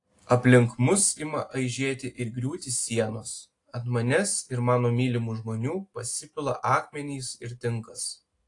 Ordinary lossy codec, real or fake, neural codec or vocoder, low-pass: AAC, 48 kbps; real; none; 10.8 kHz